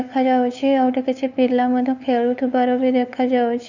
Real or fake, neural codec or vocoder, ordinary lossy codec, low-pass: fake; codec, 16 kHz, 8 kbps, FunCodec, trained on LibriTTS, 25 frames a second; none; 7.2 kHz